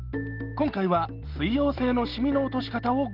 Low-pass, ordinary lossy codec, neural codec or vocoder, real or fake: 5.4 kHz; Opus, 16 kbps; none; real